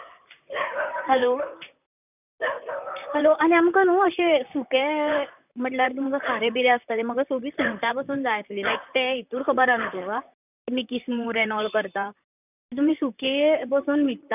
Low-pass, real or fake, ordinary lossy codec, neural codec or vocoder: 3.6 kHz; fake; none; vocoder, 44.1 kHz, 128 mel bands, Pupu-Vocoder